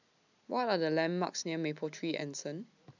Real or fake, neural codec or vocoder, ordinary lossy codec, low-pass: real; none; none; 7.2 kHz